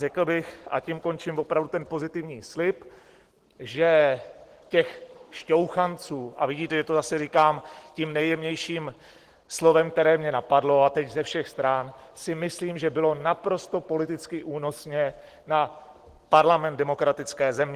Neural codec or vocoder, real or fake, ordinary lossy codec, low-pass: none; real; Opus, 16 kbps; 14.4 kHz